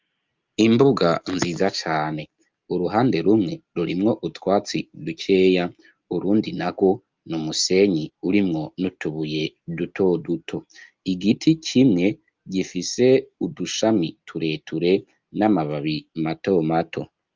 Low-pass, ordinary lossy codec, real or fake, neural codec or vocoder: 7.2 kHz; Opus, 24 kbps; real; none